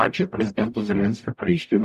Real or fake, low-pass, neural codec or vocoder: fake; 14.4 kHz; codec, 44.1 kHz, 0.9 kbps, DAC